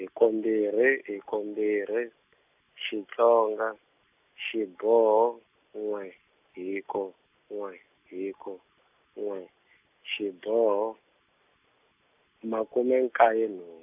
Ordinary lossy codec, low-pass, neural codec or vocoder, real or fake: none; 3.6 kHz; none; real